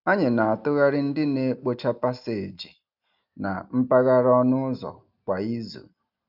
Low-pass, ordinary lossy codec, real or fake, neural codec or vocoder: 5.4 kHz; none; real; none